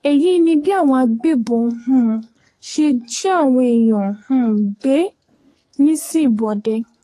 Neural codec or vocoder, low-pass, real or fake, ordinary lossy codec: codec, 44.1 kHz, 2.6 kbps, SNAC; 14.4 kHz; fake; AAC, 48 kbps